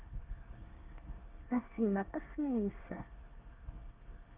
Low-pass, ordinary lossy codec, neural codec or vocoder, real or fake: 3.6 kHz; Opus, 24 kbps; codec, 24 kHz, 1 kbps, SNAC; fake